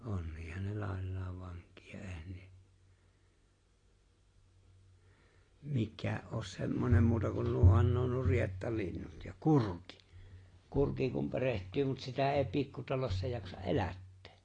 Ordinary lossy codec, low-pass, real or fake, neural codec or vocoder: AAC, 32 kbps; 9.9 kHz; real; none